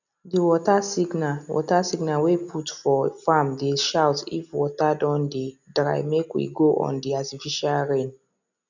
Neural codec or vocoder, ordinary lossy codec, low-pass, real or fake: none; none; 7.2 kHz; real